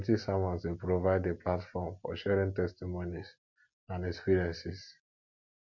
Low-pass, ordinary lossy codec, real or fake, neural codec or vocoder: 7.2 kHz; MP3, 48 kbps; real; none